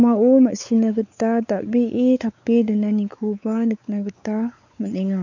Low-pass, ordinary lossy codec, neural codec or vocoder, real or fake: 7.2 kHz; none; codec, 16 kHz, 4 kbps, X-Codec, WavLM features, trained on Multilingual LibriSpeech; fake